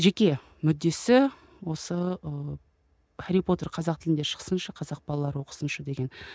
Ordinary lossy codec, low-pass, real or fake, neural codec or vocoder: none; none; real; none